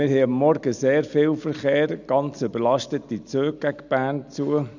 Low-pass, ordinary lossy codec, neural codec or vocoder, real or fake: 7.2 kHz; none; none; real